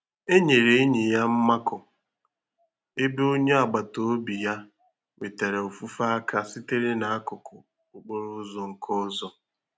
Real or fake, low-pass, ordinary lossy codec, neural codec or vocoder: real; none; none; none